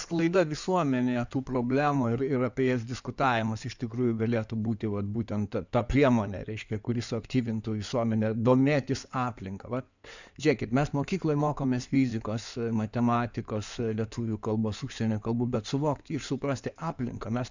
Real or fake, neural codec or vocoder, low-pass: fake; codec, 16 kHz in and 24 kHz out, 2.2 kbps, FireRedTTS-2 codec; 7.2 kHz